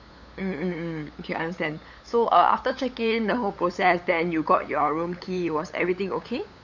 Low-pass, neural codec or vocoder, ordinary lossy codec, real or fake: 7.2 kHz; codec, 16 kHz, 8 kbps, FunCodec, trained on LibriTTS, 25 frames a second; none; fake